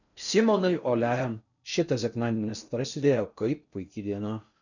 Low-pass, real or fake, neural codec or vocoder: 7.2 kHz; fake; codec, 16 kHz in and 24 kHz out, 0.6 kbps, FocalCodec, streaming, 4096 codes